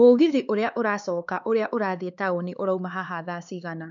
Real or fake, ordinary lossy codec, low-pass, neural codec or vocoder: fake; none; 7.2 kHz; codec, 16 kHz, 4 kbps, X-Codec, HuBERT features, trained on LibriSpeech